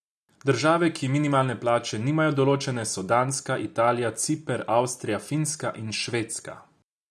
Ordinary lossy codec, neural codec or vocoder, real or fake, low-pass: none; none; real; none